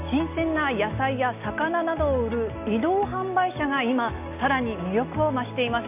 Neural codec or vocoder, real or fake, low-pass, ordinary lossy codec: none; real; 3.6 kHz; none